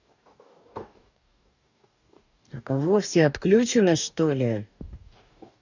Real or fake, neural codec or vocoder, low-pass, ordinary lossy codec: fake; codec, 44.1 kHz, 2.6 kbps, DAC; 7.2 kHz; none